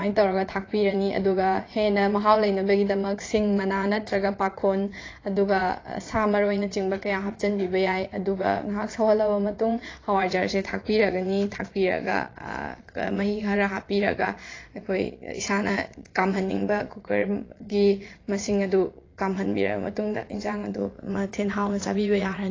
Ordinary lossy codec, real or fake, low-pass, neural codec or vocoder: AAC, 32 kbps; fake; 7.2 kHz; vocoder, 22.05 kHz, 80 mel bands, Vocos